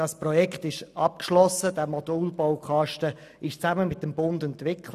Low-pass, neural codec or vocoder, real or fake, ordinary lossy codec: 14.4 kHz; none; real; none